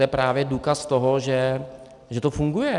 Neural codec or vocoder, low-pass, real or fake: none; 10.8 kHz; real